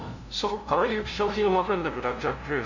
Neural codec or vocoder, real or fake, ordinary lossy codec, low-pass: codec, 16 kHz, 0.5 kbps, FunCodec, trained on LibriTTS, 25 frames a second; fake; none; 7.2 kHz